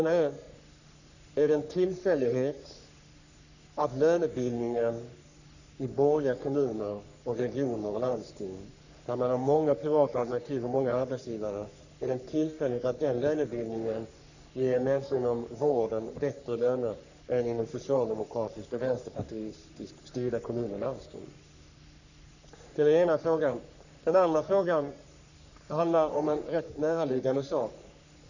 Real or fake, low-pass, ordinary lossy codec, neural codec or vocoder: fake; 7.2 kHz; none; codec, 44.1 kHz, 3.4 kbps, Pupu-Codec